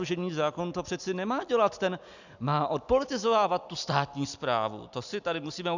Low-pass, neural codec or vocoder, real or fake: 7.2 kHz; none; real